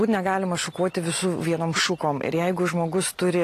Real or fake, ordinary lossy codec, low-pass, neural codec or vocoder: real; AAC, 48 kbps; 14.4 kHz; none